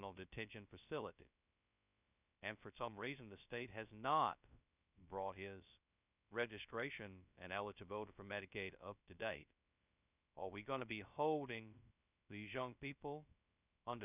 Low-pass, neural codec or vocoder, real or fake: 3.6 kHz; codec, 16 kHz, 0.2 kbps, FocalCodec; fake